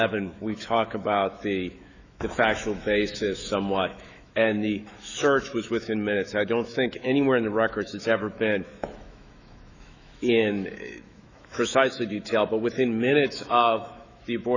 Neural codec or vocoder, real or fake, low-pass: autoencoder, 48 kHz, 128 numbers a frame, DAC-VAE, trained on Japanese speech; fake; 7.2 kHz